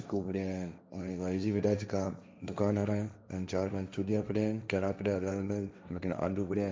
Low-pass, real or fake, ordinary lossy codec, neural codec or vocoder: none; fake; none; codec, 16 kHz, 1.1 kbps, Voila-Tokenizer